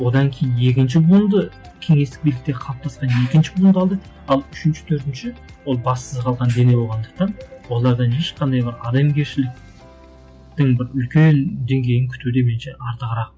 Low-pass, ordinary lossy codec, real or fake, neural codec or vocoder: none; none; real; none